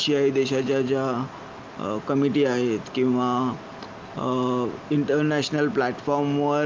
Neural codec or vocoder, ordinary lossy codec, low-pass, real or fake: none; Opus, 32 kbps; 7.2 kHz; real